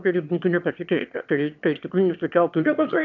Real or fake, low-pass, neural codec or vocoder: fake; 7.2 kHz; autoencoder, 22.05 kHz, a latent of 192 numbers a frame, VITS, trained on one speaker